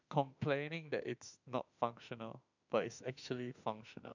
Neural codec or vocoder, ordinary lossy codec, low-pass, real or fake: autoencoder, 48 kHz, 32 numbers a frame, DAC-VAE, trained on Japanese speech; none; 7.2 kHz; fake